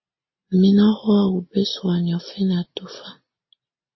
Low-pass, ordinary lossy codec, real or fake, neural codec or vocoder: 7.2 kHz; MP3, 24 kbps; real; none